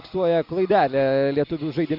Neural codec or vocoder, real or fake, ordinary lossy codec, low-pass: none; real; AAC, 48 kbps; 5.4 kHz